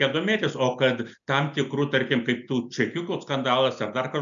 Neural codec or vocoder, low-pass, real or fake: none; 7.2 kHz; real